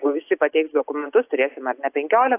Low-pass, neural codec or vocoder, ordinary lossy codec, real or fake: 3.6 kHz; none; AAC, 32 kbps; real